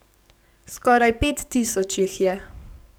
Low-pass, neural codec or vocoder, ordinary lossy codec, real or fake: none; codec, 44.1 kHz, 7.8 kbps, DAC; none; fake